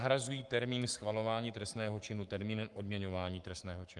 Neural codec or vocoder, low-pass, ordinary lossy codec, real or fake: codec, 44.1 kHz, 7.8 kbps, Pupu-Codec; 10.8 kHz; Opus, 32 kbps; fake